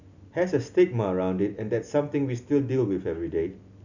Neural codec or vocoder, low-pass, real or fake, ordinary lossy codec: none; 7.2 kHz; real; none